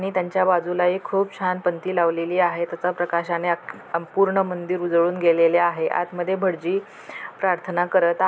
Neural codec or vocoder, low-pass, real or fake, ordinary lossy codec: none; none; real; none